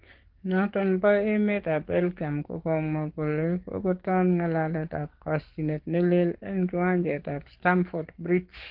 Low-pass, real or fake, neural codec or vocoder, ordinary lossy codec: 5.4 kHz; fake; codec, 44.1 kHz, 7.8 kbps, DAC; Opus, 16 kbps